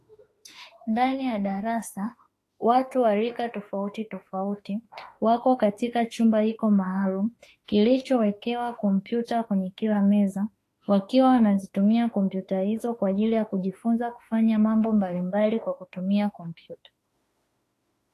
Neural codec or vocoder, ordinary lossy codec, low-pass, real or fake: autoencoder, 48 kHz, 32 numbers a frame, DAC-VAE, trained on Japanese speech; AAC, 48 kbps; 14.4 kHz; fake